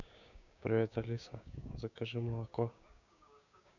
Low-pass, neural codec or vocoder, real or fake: 7.2 kHz; codec, 16 kHz, 6 kbps, DAC; fake